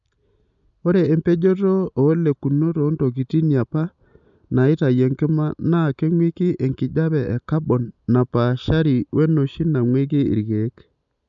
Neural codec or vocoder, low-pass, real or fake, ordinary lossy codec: none; 7.2 kHz; real; none